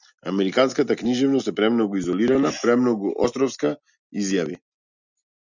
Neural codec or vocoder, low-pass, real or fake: none; 7.2 kHz; real